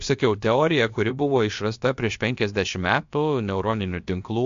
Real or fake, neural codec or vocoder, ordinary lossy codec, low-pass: fake; codec, 16 kHz, about 1 kbps, DyCAST, with the encoder's durations; MP3, 48 kbps; 7.2 kHz